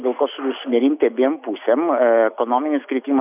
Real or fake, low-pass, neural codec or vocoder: real; 3.6 kHz; none